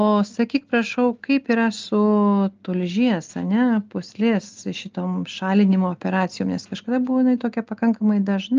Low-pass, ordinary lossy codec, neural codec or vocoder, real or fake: 7.2 kHz; Opus, 24 kbps; none; real